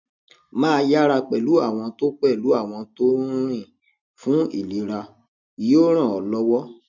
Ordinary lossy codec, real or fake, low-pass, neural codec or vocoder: none; real; 7.2 kHz; none